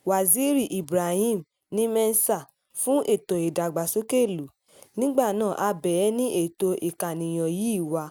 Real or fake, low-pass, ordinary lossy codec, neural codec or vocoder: real; none; none; none